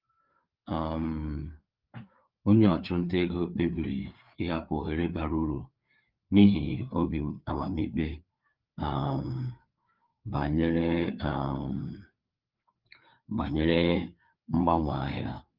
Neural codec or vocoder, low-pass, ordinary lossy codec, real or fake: codec, 16 kHz, 4 kbps, FreqCodec, larger model; 5.4 kHz; Opus, 32 kbps; fake